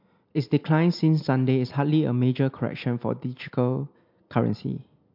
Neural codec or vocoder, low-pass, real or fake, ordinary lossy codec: none; 5.4 kHz; real; MP3, 48 kbps